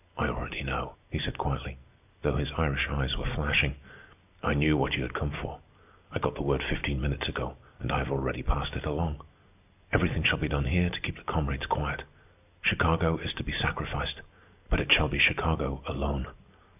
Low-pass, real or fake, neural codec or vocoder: 3.6 kHz; real; none